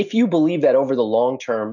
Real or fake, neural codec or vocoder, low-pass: real; none; 7.2 kHz